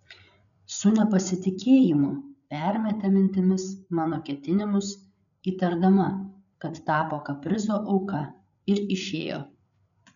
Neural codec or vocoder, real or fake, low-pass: codec, 16 kHz, 8 kbps, FreqCodec, larger model; fake; 7.2 kHz